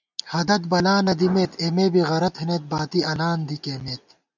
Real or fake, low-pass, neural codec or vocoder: real; 7.2 kHz; none